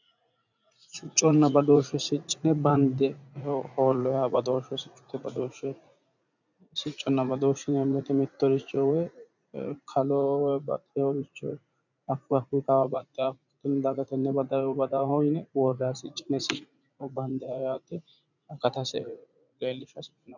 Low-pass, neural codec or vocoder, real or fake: 7.2 kHz; vocoder, 44.1 kHz, 80 mel bands, Vocos; fake